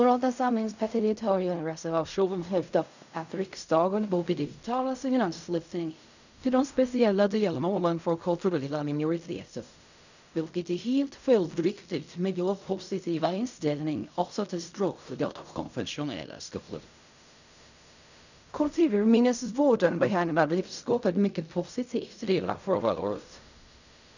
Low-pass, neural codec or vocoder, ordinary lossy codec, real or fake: 7.2 kHz; codec, 16 kHz in and 24 kHz out, 0.4 kbps, LongCat-Audio-Codec, fine tuned four codebook decoder; none; fake